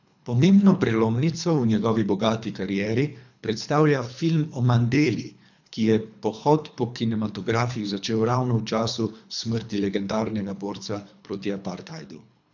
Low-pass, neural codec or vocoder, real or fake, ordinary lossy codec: 7.2 kHz; codec, 24 kHz, 3 kbps, HILCodec; fake; none